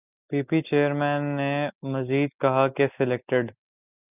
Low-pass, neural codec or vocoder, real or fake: 3.6 kHz; none; real